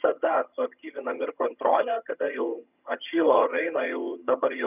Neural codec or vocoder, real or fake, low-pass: vocoder, 22.05 kHz, 80 mel bands, HiFi-GAN; fake; 3.6 kHz